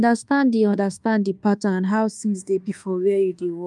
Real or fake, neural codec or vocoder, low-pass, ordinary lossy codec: fake; codec, 24 kHz, 1.2 kbps, DualCodec; 10.8 kHz; Opus, 32 kbps